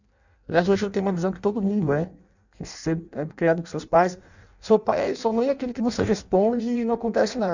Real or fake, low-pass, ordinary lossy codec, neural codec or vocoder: fake; 7.2 kHz; none; codec, 16 kHz in and 24 kHz out, 0.6 kbps, FireRedTTS-2 codec